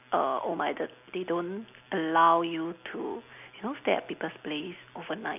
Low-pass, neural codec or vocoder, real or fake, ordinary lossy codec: 3.6 kHz; none; real; none